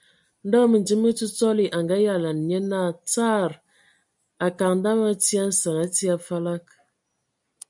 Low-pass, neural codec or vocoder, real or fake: 10.8 kHz; none; real